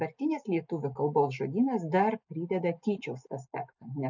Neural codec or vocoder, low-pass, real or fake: none; 7.2 kHz; real